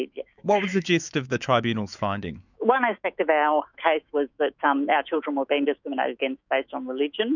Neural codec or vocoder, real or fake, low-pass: autoencoder, 48 kHz, 128 numbers a frame, DAC-VAE, trained on Japanese speech; fake; 7.2 kHz